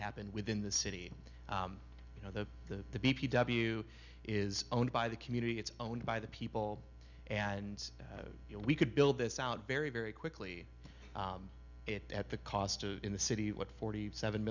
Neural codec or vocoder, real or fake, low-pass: none; real; 7.2 kHz